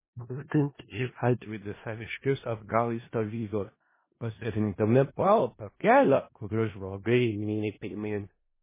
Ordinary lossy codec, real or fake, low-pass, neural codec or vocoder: MP3, 16 kbps; fake; 3.6 kHz; codec, 16 kHz in and 24 kHz out, 0.4 kbps, LongCat-Audio-Codec, four codebook decoder